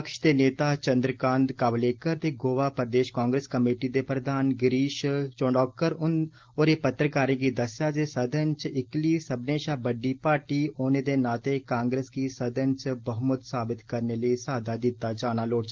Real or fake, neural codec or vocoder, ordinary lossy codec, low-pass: real; none; Opus, 16 kbps; 7.2 kHz